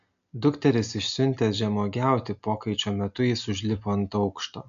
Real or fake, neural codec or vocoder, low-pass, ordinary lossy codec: real; none; 7.2 kHz; MP3, 64 kbps